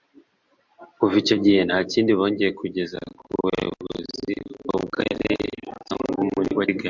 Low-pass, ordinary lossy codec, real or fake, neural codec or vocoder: 7.2 kHz; Opus, 64 kbps; real; none